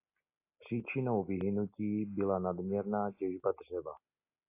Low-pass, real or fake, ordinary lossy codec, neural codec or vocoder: 3.6 kHz; real; AAC, 24 kbps; none